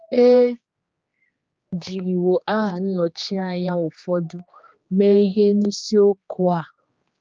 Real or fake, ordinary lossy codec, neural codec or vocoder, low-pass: fake; Opus, 24 kbps; codec, 16 kHz, 2 kbps, X-Codec, HuBERT features, trained on general audio; 7.2 kHz